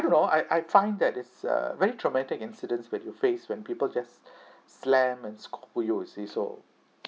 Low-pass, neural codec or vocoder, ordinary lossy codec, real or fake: none; none; none; real